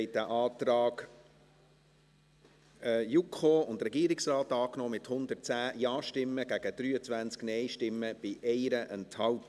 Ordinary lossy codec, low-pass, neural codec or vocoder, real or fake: none; none; none; real